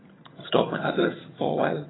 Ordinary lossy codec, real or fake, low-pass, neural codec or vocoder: AAC, 16 kbps; fake; 7.2 kHz; vocoder, 22.05 kHz, 80 mel bands, HiFi-GAN